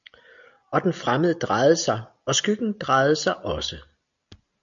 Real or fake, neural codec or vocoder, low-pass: real; none; 7.2 kHz